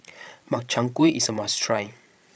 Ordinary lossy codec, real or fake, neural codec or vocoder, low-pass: none; fake; codec, 16 kHz, 16 kbps, FreqCodec, larger model; none